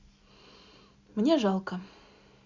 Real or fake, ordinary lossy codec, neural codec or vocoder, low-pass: real; Opus, 64 kbps; none; 7.2 kHz